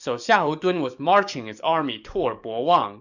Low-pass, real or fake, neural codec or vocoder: 7.2 kHz; fake; codec, 44.1 kHz, 7.8 kbps, DAC